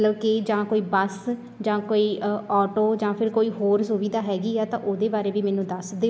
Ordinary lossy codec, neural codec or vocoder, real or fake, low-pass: none; none; real; none